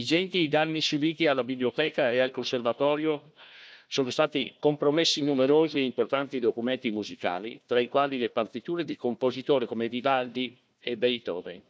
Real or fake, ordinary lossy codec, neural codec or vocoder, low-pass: fake; none; codec, 16 kHz, 1 kbps, FunCodec, trained on Chinese and English, 50 frames a second; none